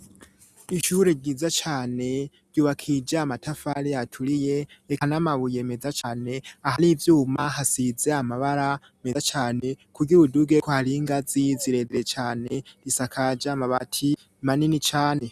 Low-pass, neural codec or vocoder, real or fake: 14.4 kHz; none; real